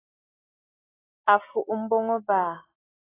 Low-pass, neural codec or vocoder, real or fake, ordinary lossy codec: 3.6 kHz; none; real; AAC, 16 kbps